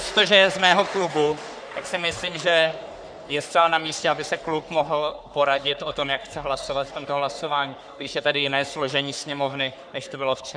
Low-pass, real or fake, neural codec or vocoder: 9.9 kHz; fake; codec, 44.1 kHz, 3.4 kbps, Pupu-Codec